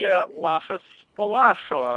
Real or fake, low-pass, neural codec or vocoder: fake; 10.8 kHz; codec, 24 kHz, 1.5 kbps, HILCodec